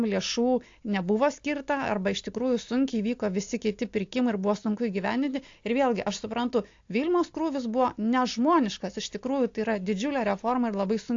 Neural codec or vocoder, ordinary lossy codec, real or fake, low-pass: none; AAC, 48 kbps; real; 7.2 kHz